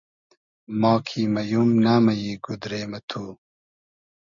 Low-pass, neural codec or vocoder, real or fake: 7.2 kHz; none; real